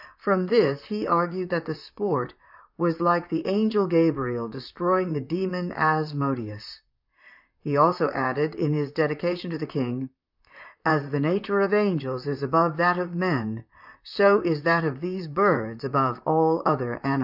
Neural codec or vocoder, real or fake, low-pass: vocoder, 44.1 kHz, 128 mel bands, Pupu-Vocoder; fake; 5.4 kHz